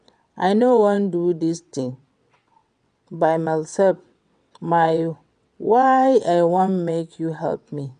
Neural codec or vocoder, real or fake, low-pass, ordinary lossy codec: vocoder, 22.05 kHz, 80 mel bands, WaveNeXt; fake; 9.9 kHz; none